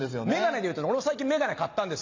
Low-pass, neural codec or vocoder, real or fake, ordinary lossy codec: 7.2 kHz; none; real; MP3, 64 kbps